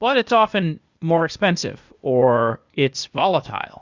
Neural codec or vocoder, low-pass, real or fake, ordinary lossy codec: codec, 16 kHz, 0.8 kbps, ZipCodec; 7.2 kHz; fake; Opus, 64 kbps